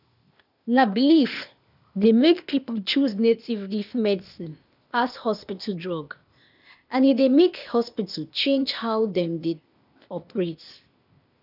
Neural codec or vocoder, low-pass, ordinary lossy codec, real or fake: codec, 16 kHz, 0.8 kbps, ZipCodec; 5.4 kHz; none; fake